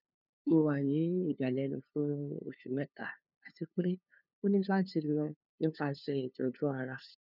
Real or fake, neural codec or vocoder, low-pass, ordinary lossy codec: fake; codec, 16 kHz, 2 kbps, FunCodec, trained on LibriTTS, 25 frames a second; 5.4 kHz; none